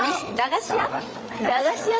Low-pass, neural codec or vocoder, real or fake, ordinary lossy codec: none; codec, 16 kHz, 8 kbps, FreqCodec, larger model; fake; none